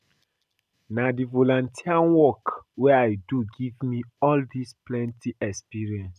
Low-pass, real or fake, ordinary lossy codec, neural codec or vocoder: 14.4 kHz; real; none; none